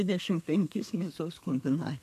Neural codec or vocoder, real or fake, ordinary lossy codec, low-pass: codec, 32 kHz, 1.9 kbps, SNAC; fake; MP3, 64 kbps; 14.4 kHz